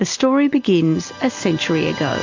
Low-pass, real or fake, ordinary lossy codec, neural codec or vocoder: 7.2 kHz; real; AAC, 48 kbps; none